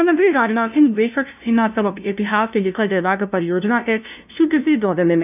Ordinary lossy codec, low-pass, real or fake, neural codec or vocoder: none; 3.6 kHz; fake; codec, 16 kHz, 0.5 kbps, FunCodec, trained on LibriTTS, 25 frames a second